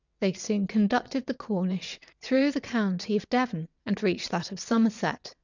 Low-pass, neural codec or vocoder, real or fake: 7.2 kHz; vocoder, 22.05 kHz, 80 mel bands, WaveNeXt; fake